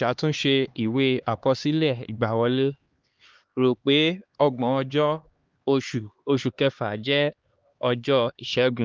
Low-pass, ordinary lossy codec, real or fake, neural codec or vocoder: 7.2 kHz; Opus, 32 kbps; fake; codec, 16 kHz, 2 kbps, X-Codec, HuBERT features, trained on LibriSpeech